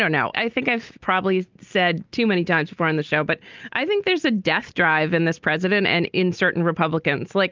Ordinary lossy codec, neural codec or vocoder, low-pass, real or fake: Opus, 32 kbps; none; 7.2 kHz; real